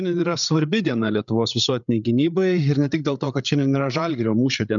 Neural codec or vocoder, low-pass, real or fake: codec, 16 kHz, 8 kbps, FreqCodec, larger model; 7.2 kHz; fake